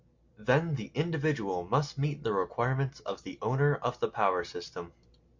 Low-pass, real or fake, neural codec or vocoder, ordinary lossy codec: 7.2 kHz; real; none; MP3, 48 kbps